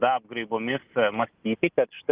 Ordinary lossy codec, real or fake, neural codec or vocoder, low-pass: Opus, 24 kbps; real; none; 3.6 kHz